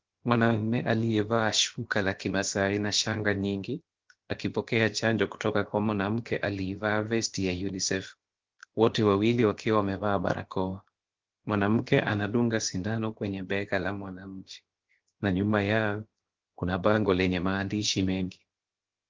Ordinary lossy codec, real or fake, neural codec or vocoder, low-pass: Opus, 16 kbps; fake; codec, 16 kHz, about 1 kbps, DyCAST, with the encoder's durations; 7.2 kHz